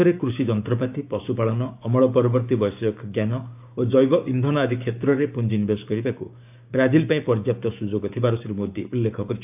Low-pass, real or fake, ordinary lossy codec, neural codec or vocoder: 3.6 kHz; fake; AAC, 32 kbps; codec, 16 kHz, 6 kbps, DAC